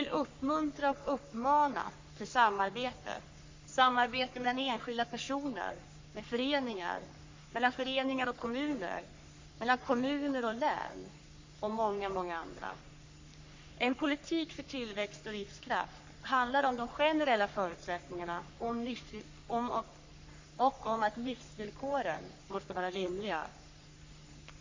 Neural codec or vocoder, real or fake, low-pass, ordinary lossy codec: codec, 44.1 kHz, 3.4 kbps, Pupu-Codec; fake; 7.2 kHz; MP3, 48 kbps